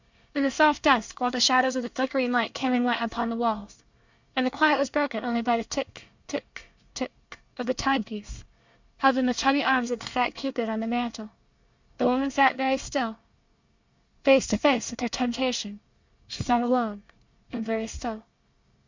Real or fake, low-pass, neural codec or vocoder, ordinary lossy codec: fake; 7.2 kHz; codec, 24 kHz, 1 kbps, SNAC; Opus, 64 kbps